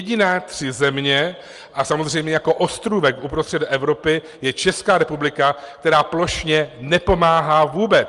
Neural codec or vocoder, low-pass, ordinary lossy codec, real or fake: none; 10.8 kHz; Opus, 32 kbps; real